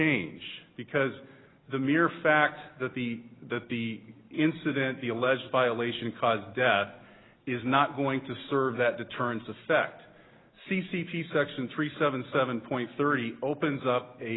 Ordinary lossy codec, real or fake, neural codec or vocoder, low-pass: AAC, 16 kbps; real; none; 7.2 kHz